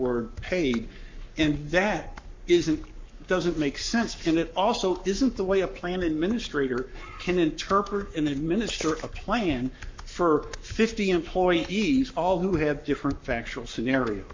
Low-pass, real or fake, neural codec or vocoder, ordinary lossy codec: 7.2 kHz; fake; codec, 44.1 kHz, 7.8 kbps, Pupu-Codec; MP3, 48 kbps